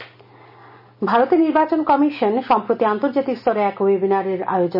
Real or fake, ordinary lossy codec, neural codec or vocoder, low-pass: real; AAC, 48 kbps; none; 5.4 kHz